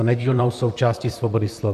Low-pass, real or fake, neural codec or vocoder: 14.4 kHz; fake; vocoder, 44.1 kHz, 128 mel bands, Pupu-Vocoder